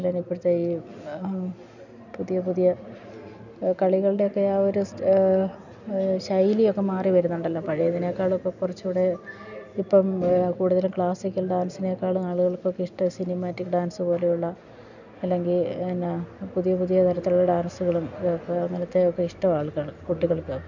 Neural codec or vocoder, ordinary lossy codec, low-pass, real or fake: none; none; 7.2 kHz; real